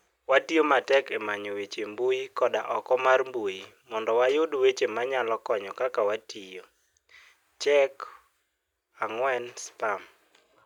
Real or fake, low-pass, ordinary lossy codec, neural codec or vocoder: real; 19.8 kHz; none; none